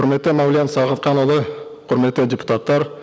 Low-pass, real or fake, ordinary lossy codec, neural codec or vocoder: none; real; none; none